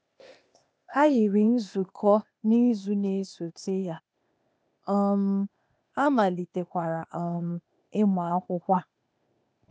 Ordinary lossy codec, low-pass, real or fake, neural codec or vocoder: none; none; fake; codec, 16 kHz, 0.8 kbps, ZipCodec